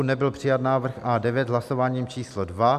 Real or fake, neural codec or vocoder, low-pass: real; none; 14.4 kHz